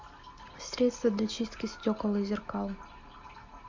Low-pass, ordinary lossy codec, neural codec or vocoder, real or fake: 7.2 kHz; MP3, 48 kbps; none; real